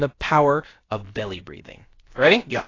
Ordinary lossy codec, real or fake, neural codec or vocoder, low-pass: AAC, 32 kbps; fake; codec, 16 kHz, about 1 kbps, DyCAST, with the encoder's durations; 7.2 kHz